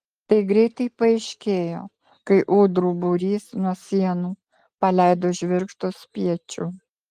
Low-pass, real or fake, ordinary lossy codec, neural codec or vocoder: 14.4 kHz; real; Opus, 24 kbps; none